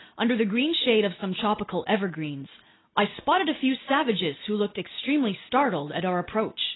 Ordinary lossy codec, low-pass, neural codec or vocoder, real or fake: AAC, 16 kbps; 7.2 kHz; none; real